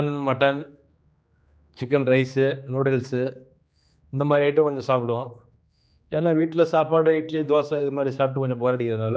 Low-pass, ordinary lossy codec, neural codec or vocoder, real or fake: none; none; codec, 16 kHz, 2 kbps, X-Codec, HuBERT features, trained on general audio; fake